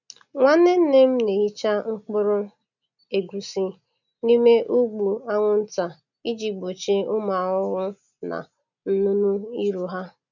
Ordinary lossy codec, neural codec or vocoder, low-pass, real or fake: none; none; 7.2 kHz; real